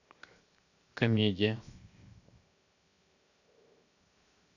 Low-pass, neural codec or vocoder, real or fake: 7.2 kHz; codec, 16 kHz, 0.7 kbps, FocalCodec; fake